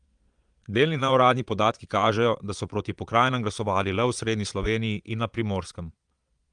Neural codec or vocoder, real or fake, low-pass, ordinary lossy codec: vocoder, 22.05 kHz, 80 mel bands, Vocos; fake; 9.9 kHz; Opus, 32 kbps